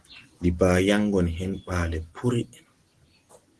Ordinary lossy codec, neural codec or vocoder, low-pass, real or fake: Opus, 16 kbps; none; 10.8 kHz; real